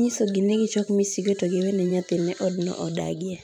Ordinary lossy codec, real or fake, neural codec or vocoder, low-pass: none; fake; vocoder, 44.1 kHz, 128 mel bands every 512 samples, BigVGAN v2; 19.8 kHz